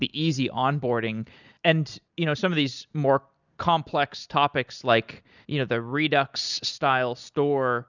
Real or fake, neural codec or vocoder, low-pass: real; none; 7.2 kHz